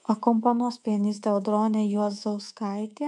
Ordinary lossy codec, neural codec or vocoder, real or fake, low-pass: MP3, 96 kbps; codec, 24 kHz, 3.1 kbps, DualCodec; fake; 10.8 kHz